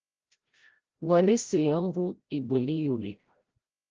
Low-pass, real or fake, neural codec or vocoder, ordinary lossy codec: 7.2 kHz; fake; codec, 16 kHz, 0.5 kbps, FreqCodec, larger model; Opus, 16 kbps